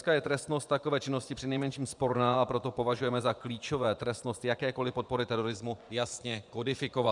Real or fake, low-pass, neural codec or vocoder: fake; 10.8 kHz; vocoder, 24 kHz, 100 mel bands, Vocos